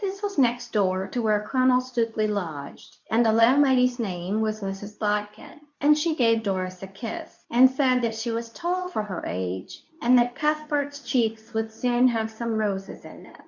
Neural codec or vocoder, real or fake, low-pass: codec, 24 kHz, 0.9 kbps, WavTokenizer, medium speech release version 2; fake; 7.2 kHz